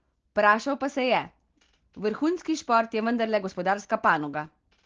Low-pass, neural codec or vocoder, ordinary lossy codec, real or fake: 7.2 kHz; none; Opus, 16 kbps; real